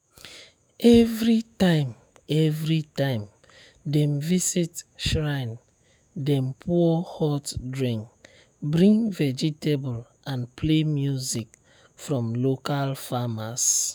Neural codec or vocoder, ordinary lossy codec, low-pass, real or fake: autoencoder, 48 kHz, 128 numbers a frame, DAC-VAE, trained on Japanese speech; none; none; fake